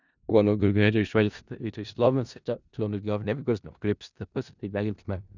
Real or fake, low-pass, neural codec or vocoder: fake; 7.2 kHz; codec, 16 kHz in and 24 kHz out, 0.4 kbps, LongCat-Audio-Codec, four codebook decoder